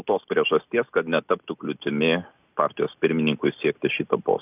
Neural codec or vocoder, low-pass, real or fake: none; 3.6 kHz; real